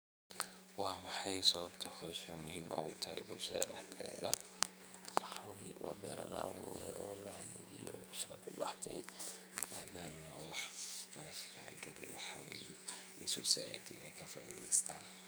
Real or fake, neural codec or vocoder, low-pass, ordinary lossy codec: fake; codec, 44.1 kHz, 2.6 kbps, SNAC; none; none